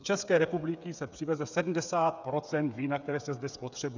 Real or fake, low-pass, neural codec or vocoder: fake; 7.2 kHz; codec, 16 kHz, 8 kbps, FreqCodec, smaller model